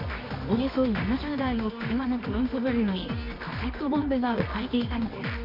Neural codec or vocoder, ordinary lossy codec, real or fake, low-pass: codec, 24 kHz, 0.9 kbps, WavTokenizer, medium music audio release; MP3, 48 kbps; fake; 5.4 kHz